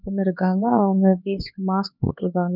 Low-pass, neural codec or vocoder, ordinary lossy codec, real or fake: 5.4 kHz; codec, 16 kHz, 2 kbps, X-Codec, HuBERT features, trained on LibriSpeech; none; fake